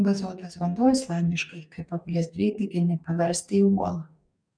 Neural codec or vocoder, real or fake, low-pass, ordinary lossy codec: codec, 44.1 kHz, 2.6 kbps, DAC; fake; 9.9 kHz; MP3, 96 kbps